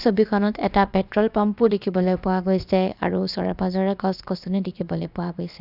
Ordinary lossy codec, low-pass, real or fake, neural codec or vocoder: none; 5.4 kHz; fake; codec, 16 kHz, about 1 kbps, DyCAST, with the encoder's durations